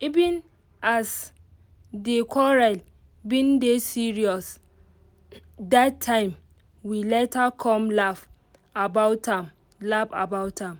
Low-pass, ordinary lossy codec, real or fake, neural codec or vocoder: none; none; real; none